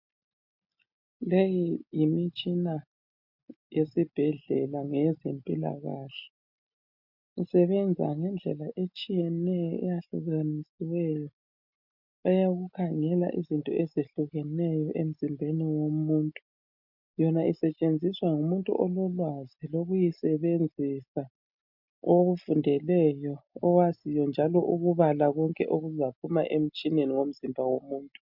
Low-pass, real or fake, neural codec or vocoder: 5.4 kHz; real; none